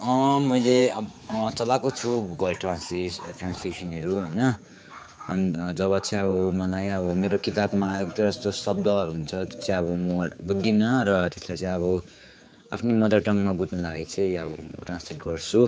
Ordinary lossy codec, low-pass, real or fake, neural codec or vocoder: none; none; fake; codec, 16 kHz, 4 kbps, X-Codec, HuBERT features, trained on general audio